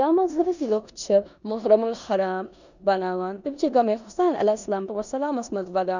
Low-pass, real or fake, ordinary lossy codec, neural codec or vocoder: 7.2 kHz; fake; none; codec, 16 kHz in and 24 kHz out, 0.9 kbps, LongCat-Audio-Codec, four codebook decoder